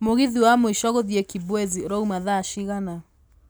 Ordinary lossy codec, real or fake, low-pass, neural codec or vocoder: none; real; none; none